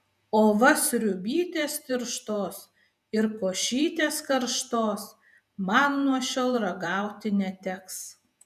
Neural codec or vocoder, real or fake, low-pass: vocoder, 48 kHz, 128 mel bands, Vocos; fake; 14.4 kHz